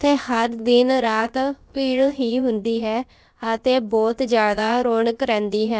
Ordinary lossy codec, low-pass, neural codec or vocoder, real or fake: none; none; codec, 16 kHz, about 1 kbps, DyCAST, with the encoder's durations; fake